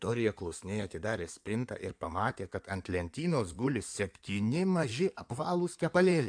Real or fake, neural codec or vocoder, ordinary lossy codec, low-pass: fake; codec, 16 kHz in and 24 kHz out, 2.2 kbps, FireRedTTS-2 codec; AAC, 64 kbps; 9.9 kHz